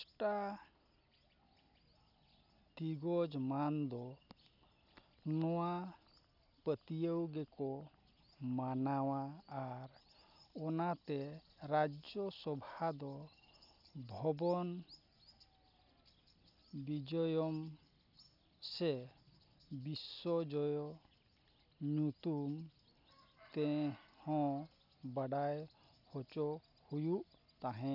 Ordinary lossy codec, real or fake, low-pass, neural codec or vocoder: none; real; 5.4 kHz; none